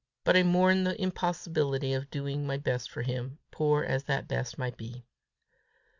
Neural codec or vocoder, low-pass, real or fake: none; 7.2 kHz; real